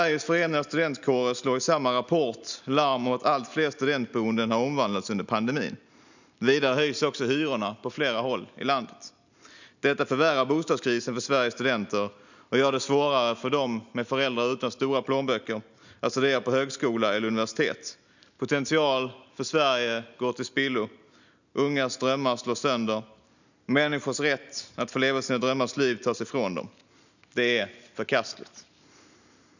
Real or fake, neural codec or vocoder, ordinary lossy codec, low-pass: real; none; none; 7.2 kHz